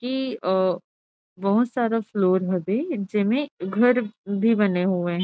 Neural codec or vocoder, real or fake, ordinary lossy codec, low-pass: none; real; none; none